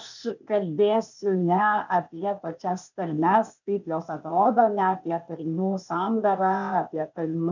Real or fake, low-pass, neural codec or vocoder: fake; 7.2 kHz; codec, 16 kHz, 0.8 kbps, ZipCodec